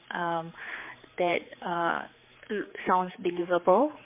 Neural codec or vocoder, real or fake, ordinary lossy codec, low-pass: codec, 16 kHz, 4 kbps, X-Codec, HuBERT features, trained on general audio; fake; MP3, 24 kbps; 3.6 kHz